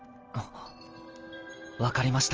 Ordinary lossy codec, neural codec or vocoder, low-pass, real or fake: Opus, 24 kbps; none; 7.2 kHz; real